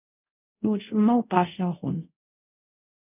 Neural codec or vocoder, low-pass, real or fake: codec, 24 kHz, 0.5 kbps, DualCodec; 3.6 kHz; fake